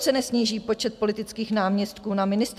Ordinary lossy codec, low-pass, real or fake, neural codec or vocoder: MP3, 96 kbps; 14.4 kHz; real; none